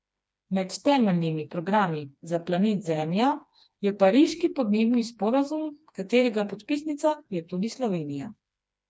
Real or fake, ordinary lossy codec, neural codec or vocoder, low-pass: fake; none; codec, 16 kHz, 2 kbps, FreqCodec, smaller model; none